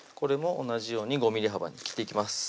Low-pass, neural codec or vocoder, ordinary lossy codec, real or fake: none; none; none; real